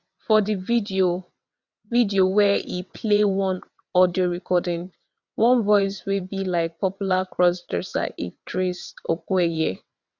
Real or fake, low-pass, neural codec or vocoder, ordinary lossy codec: fake; 7.2 kHz; vocoder, 22.05 kHz, 80 mel bands, WaveNeXt; none